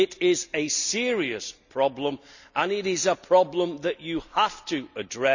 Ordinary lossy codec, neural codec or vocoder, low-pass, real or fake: none; none; 7.2 kHz; real